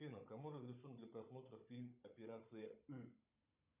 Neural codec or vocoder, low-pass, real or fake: codec, 16 kHz, 16 kbps, FunCodec, trained on Chinese and English, 50 frames a second; 3.6 kHz; fake